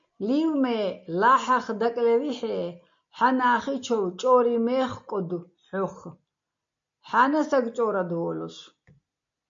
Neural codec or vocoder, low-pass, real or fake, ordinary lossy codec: none; 7.2 kHz; real; MP3, 48 kbps